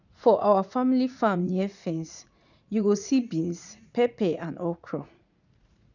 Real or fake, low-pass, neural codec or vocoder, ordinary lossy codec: fake; 7.2 kHz; vocoder, 44.1 kHz, 80 mel bands, Vocos; none